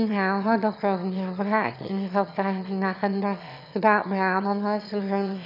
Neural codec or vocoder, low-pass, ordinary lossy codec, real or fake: autoencoder, 22.05 kHz, a latent of 192 numbers a frame, VITS, trained on one speaker; 5.4 kHz; none; fake